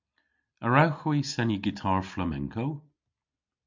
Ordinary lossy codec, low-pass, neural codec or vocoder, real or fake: MP3, 64 kbps; 7.2 kHz; none; real